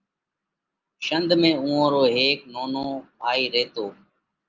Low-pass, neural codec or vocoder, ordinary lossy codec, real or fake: 7.2 kHz; none; Opus, 24 kbps; real